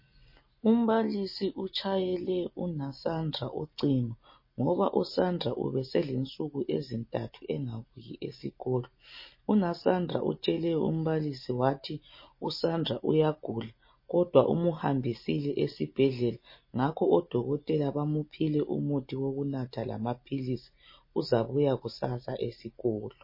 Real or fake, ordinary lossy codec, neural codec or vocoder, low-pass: real; MP3, 24 kbps; none; 5.4 kHz